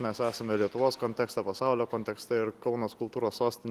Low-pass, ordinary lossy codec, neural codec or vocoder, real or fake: 14.4 kHz; Opus, 24 kbps; none; real